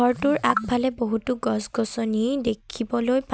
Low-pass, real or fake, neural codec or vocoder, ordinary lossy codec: none; real; none; none